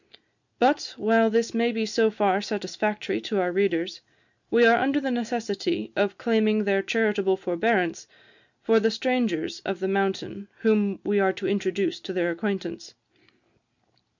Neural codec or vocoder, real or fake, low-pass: none; real; 7.2 kHz